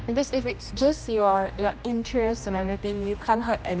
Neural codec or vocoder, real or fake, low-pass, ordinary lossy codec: codec, 16 kHz, 1 kbps, X-Codec, HuBERT features, trained on general audio; fake; none; none